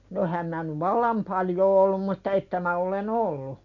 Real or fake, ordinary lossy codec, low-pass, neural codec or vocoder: real; none; 7.2 kHz; none